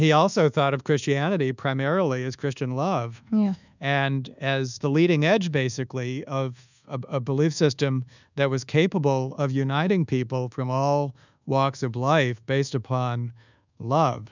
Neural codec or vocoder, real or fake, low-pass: codec, 24 kHz, 1.2 kbps, DualCodec; fake; 7.2 kHz